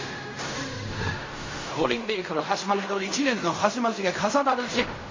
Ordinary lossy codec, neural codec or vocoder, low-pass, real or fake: MP3, 32 kbps; codec, 16 kHz in and 24 kHz out, 0.4 kbps, LongCat-Audio-Codec, fine tuned four codebook decoder; 7.2 kHz; fake